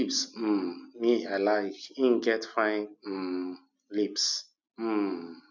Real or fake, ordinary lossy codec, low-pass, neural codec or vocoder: real; none; 7.2 kHz; none